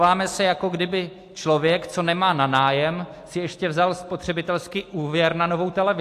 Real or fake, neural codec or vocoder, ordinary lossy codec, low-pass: real; none; AAC, 64 kbps; 14.4 kHz